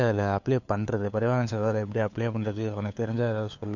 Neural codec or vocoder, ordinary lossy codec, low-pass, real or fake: codec, 16 kHz, 4 kbps, FunCodec, trained on LibriTTS, 50 frames a second; none; 7.2 kHz; fake